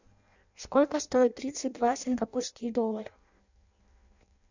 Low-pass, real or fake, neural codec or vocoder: 7.2 kHz; fake; codec, 16 kHz in and 24 kHz out, 0.6 kbps, FireRedTTS-2 codec